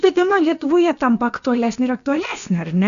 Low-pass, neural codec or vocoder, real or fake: 7.2 kHz; codec, 16 kHz, about 1 kbps, DyCAST, with the encoder's durations; fake